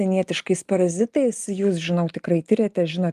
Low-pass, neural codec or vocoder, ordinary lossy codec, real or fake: 14.4 kHz; none; Opus, 32 kbps; real